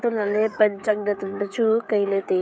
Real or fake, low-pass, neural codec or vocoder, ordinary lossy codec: fake; none; codec, 16 kHz, 16 kbps, FreqCodec, smaller model; none